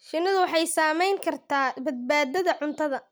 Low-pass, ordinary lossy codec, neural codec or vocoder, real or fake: none; none; none; real